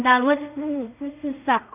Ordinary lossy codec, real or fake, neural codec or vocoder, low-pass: none; fake; codec, 16 kHz in and 24 kHz out, 0.4 kbps, LongCat-Audio-Codec, two codebook decoder; 3.6 kHz